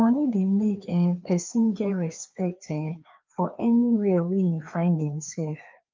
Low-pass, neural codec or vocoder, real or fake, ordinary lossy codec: 7.2 kHz; codec, 16 kHz, 2 kbps, FreqCodec, larger model; fake; Opus, 24 kbps